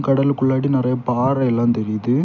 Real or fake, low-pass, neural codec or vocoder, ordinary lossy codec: real; 7.2 kHz; none; none